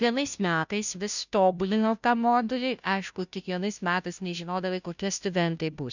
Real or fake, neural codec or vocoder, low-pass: fake; codec, 16 kHz, 0.5 kbps, FunCodec, trained on Chinese and English, 25 frames a second; 7.2 kHz